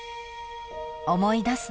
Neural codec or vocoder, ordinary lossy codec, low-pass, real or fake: none; none; none; real